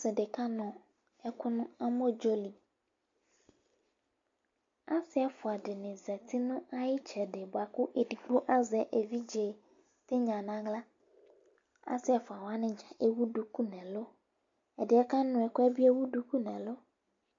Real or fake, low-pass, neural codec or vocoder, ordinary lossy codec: real; 7.2 kHz; none; MP3, 48 kbps